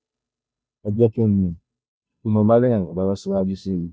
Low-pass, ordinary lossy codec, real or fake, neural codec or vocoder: none; none; fake; codec, 16 kHz, 0.5 kbps, FunCodec, trained on Chinese and English, 25 frames a second